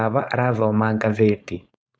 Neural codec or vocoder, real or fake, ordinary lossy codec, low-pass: codec, 16 kHz, 4.8 kbps, FACodec; fake; none; none